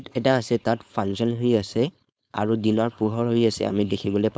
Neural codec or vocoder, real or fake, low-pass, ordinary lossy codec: codec, 16 kHz, 4.8 kbps, FACodec; fake; none; none